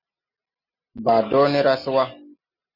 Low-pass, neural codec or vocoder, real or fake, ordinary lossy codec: 5.4 kHz; none; real; Opus, 64 kbps